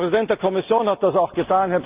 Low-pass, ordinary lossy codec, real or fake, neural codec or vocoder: 3.6 kHz; Opus, 32 kbps; real; none